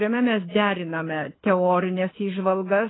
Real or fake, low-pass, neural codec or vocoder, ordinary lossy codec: fake; 7.2 kHz; codec, 24 kHz, 6 kbps, HILCodec; AAC, 16 kbps